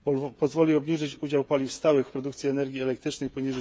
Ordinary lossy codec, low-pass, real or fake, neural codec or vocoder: none; none; fake; codec, 16 kHz, 8 kbps, FreqCodec, smaller model